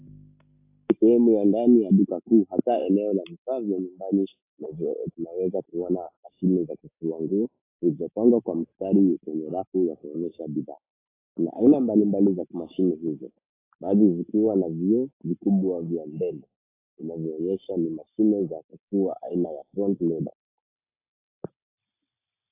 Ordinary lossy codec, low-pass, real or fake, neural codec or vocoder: AAC, 24 kbps; 3.6 kHz; real; none